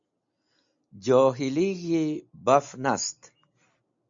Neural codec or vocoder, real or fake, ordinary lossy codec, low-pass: none; real; MP3, 48 kbps; 7.2 kHz